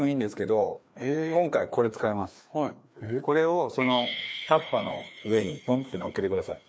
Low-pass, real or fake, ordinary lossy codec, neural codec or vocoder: none; fake; none; codec, 16 kHz, 2 kbps, FreqCodec, larger model